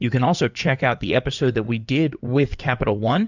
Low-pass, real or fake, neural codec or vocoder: 7.2 kHz; fake; codec, 16 kHz in and 24 kHz out, 2.2 kbps, FireRedTTS-2 codec